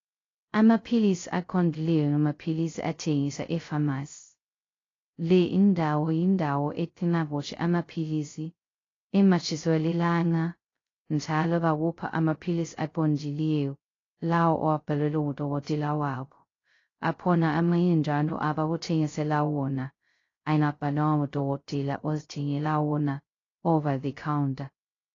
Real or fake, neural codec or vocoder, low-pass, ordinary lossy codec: fake; codec, 16 kHz, 0.2 kbps, FocalCodec; 7.2 kHz; AAC, 32 kbps